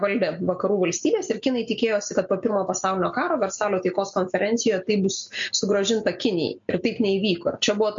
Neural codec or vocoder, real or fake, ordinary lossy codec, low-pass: none; real; MP3, 48 kbps; 7.2 kHz